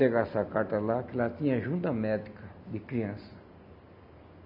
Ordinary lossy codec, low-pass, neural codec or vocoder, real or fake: none; 5.4 kHz; none; real